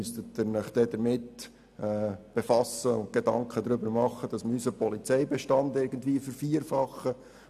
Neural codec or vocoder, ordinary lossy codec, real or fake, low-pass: none; none; real; 14.4 kHz